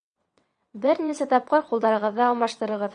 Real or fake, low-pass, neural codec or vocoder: fake; 9.9 kHz; vocoder, 22.05 kHz, 80 mel bands, WaveNeXt